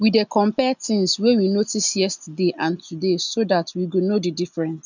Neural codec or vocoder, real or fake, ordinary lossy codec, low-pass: none; real; none; 7.2 kHz